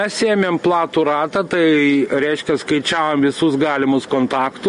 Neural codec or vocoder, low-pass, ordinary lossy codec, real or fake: none; 10.8 kHz; MP3, 48 kbps; real